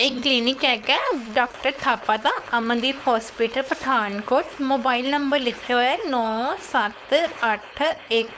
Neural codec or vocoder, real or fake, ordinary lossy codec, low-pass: codec, 16 kHz, 4.8 kbps, FACodec; fake; none; none